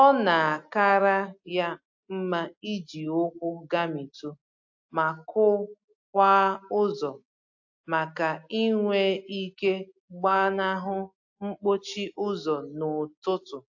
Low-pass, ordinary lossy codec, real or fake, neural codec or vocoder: 7.2 kHz; AAC, 48 kbps; real; none